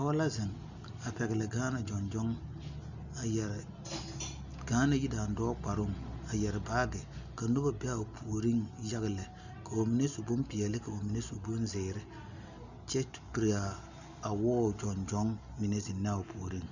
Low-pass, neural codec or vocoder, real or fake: 7.2 kHz; none; real